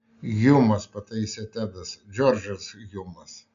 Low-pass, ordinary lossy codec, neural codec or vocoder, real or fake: 7.2 kHz; AAC, 96 kbps; none; real